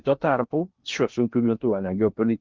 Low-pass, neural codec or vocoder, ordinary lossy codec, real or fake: 7.2 kHz; codec, 16 kHz in and 24 kHz out, 0.6 kbps, FocalCodec, streaming, 2048 codes; Opus, 32 kbps; fake